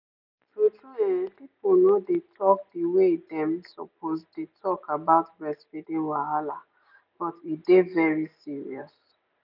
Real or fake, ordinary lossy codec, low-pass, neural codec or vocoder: real; none; 5.4 kHz; none